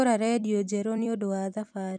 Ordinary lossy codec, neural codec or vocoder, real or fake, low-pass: none; vocoder, 44.1 kHz, 128 mel bands every 256 samples, BigVGAN v2; fake; 9.9 kHz